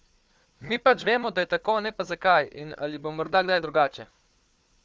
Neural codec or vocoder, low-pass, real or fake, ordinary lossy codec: codec, 16 kHz, 4 kbps, FunCodec, trained on Chinese and English, 50 frames a second; none; fake; none